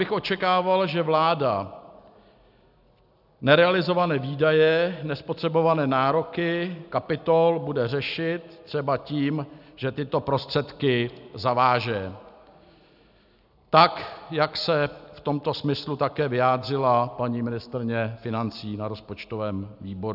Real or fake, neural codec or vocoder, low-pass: real; none; 5.4 kHz